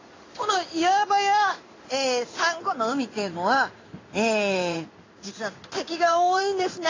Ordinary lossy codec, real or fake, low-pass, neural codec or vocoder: AAC, 32 kbps; fake; 7.2 kHz; codec, 16 kHz in and 24 kHz out, 1 kbps, XY-Tokenizer